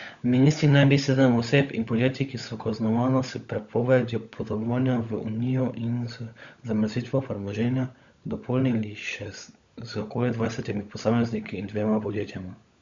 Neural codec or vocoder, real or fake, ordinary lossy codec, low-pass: codec, 16 kHz, 16 kbps, FunCodec, trained on LibriTTS, 50 frames a second; fake; Opus, 64 kbps; 7.2 kHz